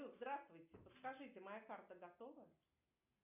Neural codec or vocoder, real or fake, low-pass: none; real; 3.6 kHz